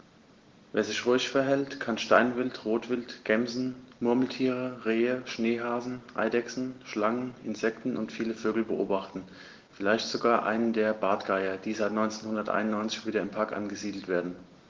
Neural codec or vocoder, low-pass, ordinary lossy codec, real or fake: none; 7.2 kHz; Opus, 16 kbps; real